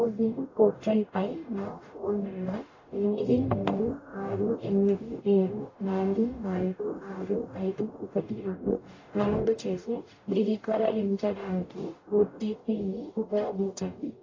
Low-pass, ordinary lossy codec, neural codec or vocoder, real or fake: 7.2 kHz; AAC, 32 kbps; codec, 44.1 kHz, 0.9 kbps, DAC; fake